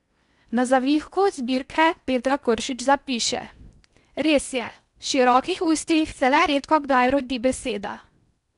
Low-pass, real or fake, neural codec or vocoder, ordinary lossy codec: 10.8 kHz; fake; codec, 16 kHz in and 24 kHz out, 0.8 kbps, FocalCodec, streaming, 65536 codes; none